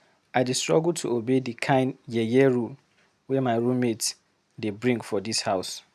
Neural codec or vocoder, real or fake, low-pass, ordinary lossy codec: none; real; 14.4 kHz; none